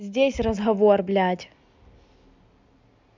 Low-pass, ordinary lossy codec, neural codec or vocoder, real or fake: 7.2 kHz; MP3, 64 kbps; none; real